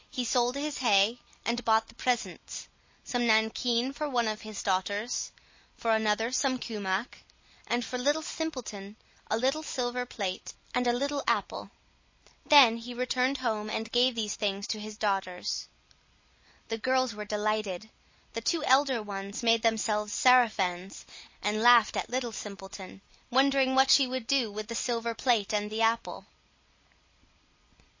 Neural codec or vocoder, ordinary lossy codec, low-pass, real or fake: none; MP3, 32 kbps; 7.2 kHz; real